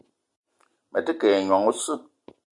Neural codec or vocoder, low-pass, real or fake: none; 10.8 kHz; real